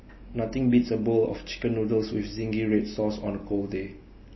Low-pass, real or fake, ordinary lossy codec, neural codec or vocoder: 7.2 kHz; real; MP3, 24 kbps; none